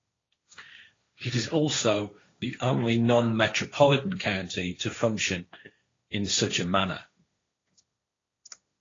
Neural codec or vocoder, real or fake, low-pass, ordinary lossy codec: codec, 16 kHz, 1.1 kbps, Voila-Tokenizer; fake; 7.2 kHz; AAC, 32 kbps